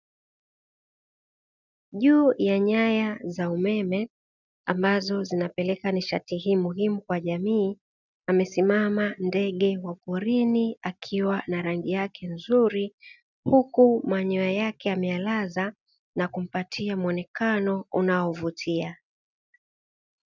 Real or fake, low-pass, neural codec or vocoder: real; 7.2 kHz; none